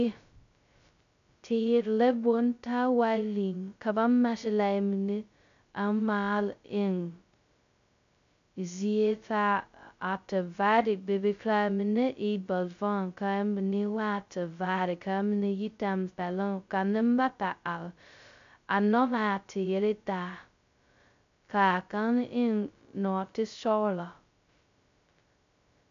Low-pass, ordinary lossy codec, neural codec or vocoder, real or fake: 7.2 kHz; MP3, 64 kbps; codec, 16 kHz, 0.2 kbps, FocalCodec; fake